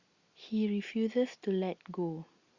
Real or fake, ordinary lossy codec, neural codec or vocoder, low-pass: real; Opus, 64 kbps; none; 7.2 kHz